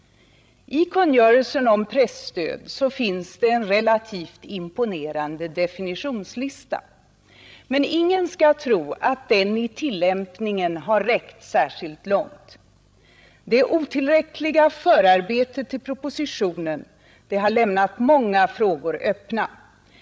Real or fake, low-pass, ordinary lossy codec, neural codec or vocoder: fake; none; none; codec, 16 kHz, 16 kbps, FreqCodec, larger model